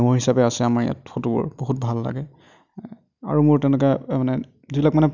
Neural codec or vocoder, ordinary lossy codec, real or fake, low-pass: none; none; real; 7.2 kHz